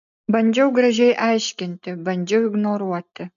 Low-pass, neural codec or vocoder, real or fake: 7.2 kHz; none; real